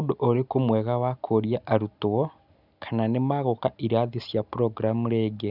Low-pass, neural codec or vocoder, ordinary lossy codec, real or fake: 5.4 kHz; none; none; real